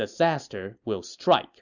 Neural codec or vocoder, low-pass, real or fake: none; 7.2 kHz; real